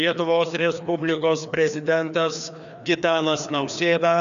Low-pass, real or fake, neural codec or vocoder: 7.2 kHz; fake; codec, 16 kHz, 2 kbps, FreqCodec, larger model